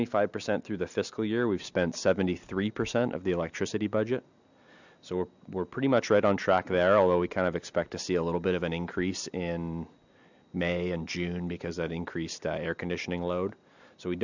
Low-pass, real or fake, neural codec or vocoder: 7.2 kHz; real; none